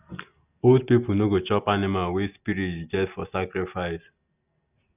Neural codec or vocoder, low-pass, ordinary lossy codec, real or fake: none; 3.6 kHz; none; real